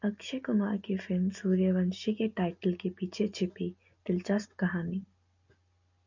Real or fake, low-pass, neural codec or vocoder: real; 7.2 kHz; none